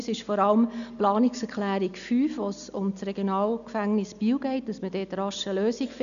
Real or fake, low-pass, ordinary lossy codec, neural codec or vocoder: real; 7.2 kHz; none; none